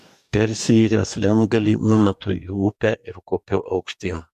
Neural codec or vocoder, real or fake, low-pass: codec, 44.1 kHz, 2.6 kbps, DAC; fake; 14.4 kHz